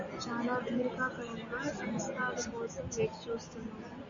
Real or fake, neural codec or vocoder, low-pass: real; none; 7.2 kHz